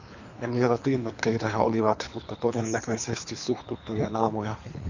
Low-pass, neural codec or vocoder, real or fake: 7.2 kHz; codec, 24 kHz, 3 kbps, HILCodec; fake